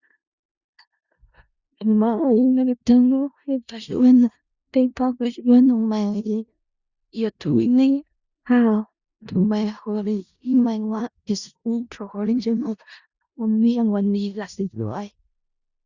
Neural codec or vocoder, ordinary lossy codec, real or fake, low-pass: codec, 16 kHz in and 24 kHz out, 0.4 kbps, LongCat-Audio-Codec, four codebook decoder; Opus, 64 kbps; fake; 7.2 kHz